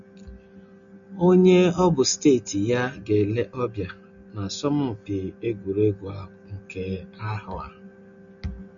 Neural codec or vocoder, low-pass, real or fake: none; 7.2 kHz; real